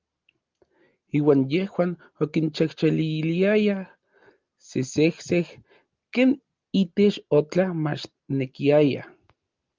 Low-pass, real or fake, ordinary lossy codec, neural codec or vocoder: 7.2 kHz; real; Opus, 32 kbps; none